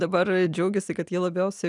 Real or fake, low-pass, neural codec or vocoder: real; 10.8 kHz; none